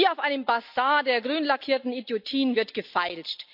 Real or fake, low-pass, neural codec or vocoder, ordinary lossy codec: real; 5.4 kHz; none; AAC, 48 kbps